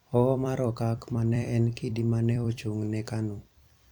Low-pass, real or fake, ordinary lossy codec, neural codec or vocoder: 19.8 kHz; fake; none; vocoder, 44.1 kHz, 128 mel bands every 256 samples, BigVGAN v2